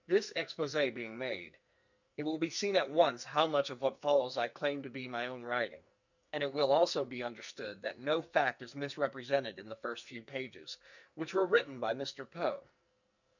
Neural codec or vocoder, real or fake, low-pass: codec, 44.1 kHz, 2.6 kbps, SNAC; fake; 7.2 kHz